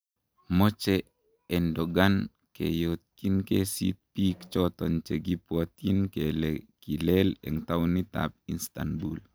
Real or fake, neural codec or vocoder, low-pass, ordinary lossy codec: real; none; none; none